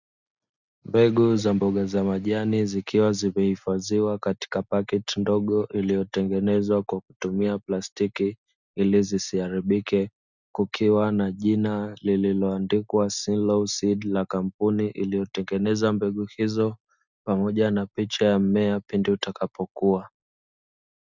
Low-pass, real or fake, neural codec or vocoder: 7.2 kHz; real; none